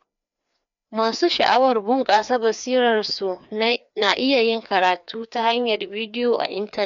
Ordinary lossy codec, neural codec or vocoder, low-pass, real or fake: none; codec, 16 kHz, 2 kbps, FreqCodec, larger model; 7.2 kHz; fake